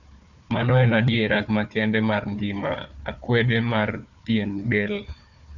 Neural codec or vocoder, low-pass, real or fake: codec, 16 kHz, 4 kbps, FunCodec, trained on Chinese and English, 50 frames a second; 7.2 kHz; fake